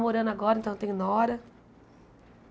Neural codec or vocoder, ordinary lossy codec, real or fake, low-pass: none; none; real; none